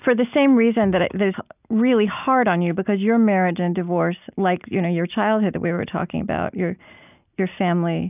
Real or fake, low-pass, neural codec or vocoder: real; 3.6 kHz; none